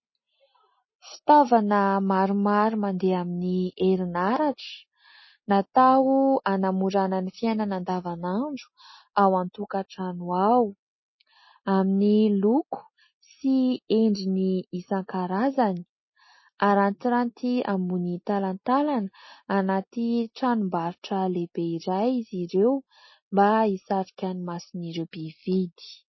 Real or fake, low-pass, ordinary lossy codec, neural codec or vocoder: real; 7.2 kHz; MP3, 24 kbps; none